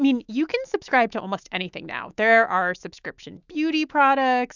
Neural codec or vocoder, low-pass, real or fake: autoencoder, 48 kHz, 128 numbers a frame, DAC-VAE, trained on Japanese speech; 7.2 kHz; fake